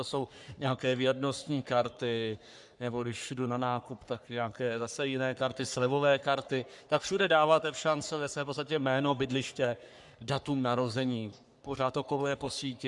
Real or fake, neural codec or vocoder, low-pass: fake; codec, 44.1 kHz, 3.4 kbps, Pupu-Codec; 10.8 kHz